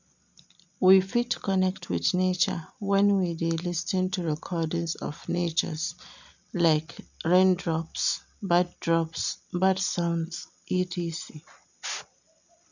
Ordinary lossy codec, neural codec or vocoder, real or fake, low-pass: none; none; real; 7.2 kHz